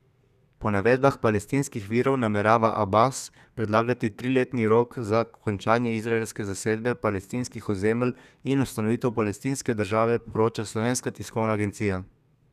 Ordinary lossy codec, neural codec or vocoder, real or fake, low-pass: none; codec, 32 kHz, 1.9 kbps, SNAC; fake; 14.4 kHz